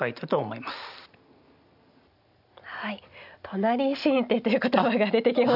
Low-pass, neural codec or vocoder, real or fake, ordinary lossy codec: 5.4 kHz; none; real; none